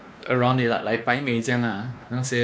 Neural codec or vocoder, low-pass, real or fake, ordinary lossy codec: codec, 16 kHz, 2 kbps, X-Codec, WavLM features, trained on Multilingual LibriSpeech; none; fake; none